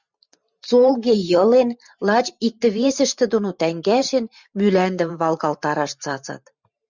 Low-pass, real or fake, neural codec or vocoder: 7.2 kHz; fake; vocoder, 44.1 kHz, 128 mel bands every 512 samples, BigVGAN v2